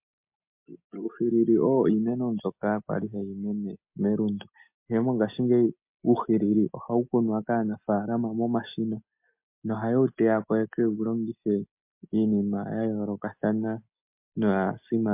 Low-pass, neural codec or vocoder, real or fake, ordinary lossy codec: 3.6 kHz; none; real; MP3, 32 kbps